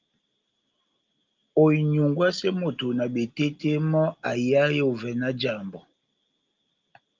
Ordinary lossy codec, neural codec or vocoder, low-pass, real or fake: Opus, 32 kbps; none; 7.2 kHz; real